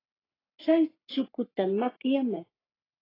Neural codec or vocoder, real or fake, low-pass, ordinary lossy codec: codec, 44.1 kHz, 3.4 kbps, Pupu-Codec; fake; 5.4 kHz; AAC, 24 kbps